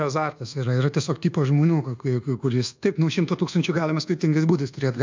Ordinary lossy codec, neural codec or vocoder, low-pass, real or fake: MP3, 64 kbps; codec, 24 kHz, 1.2 kbps, DualCodec; 7.2 kHz; fake